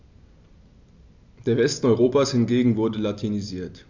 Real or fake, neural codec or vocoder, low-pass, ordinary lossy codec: real; none; 7.2 kHz; none